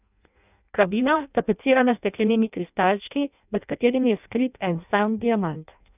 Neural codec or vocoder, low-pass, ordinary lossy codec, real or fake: codec, 16 kHz in and 24 kHz out, 0.6 kbps, FireRedTTS-2 codec; 3.6 kHz; none; fake